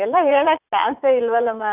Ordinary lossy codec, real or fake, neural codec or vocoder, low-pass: none; fake; vocoder, 44.1 kHz, 80 mel bands, Vocos; 3.6 kHz